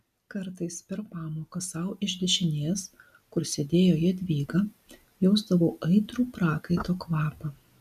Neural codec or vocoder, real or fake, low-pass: none; real; 14.4 kHz